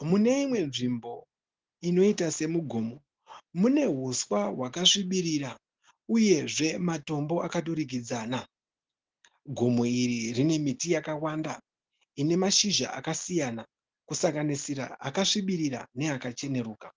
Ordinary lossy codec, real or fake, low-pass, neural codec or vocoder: Opus, 16 kbps; real; 7.2 kHz; none